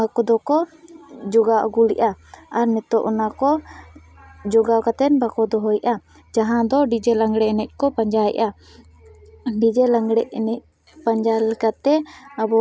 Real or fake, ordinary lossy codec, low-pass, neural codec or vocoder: real; none; none; none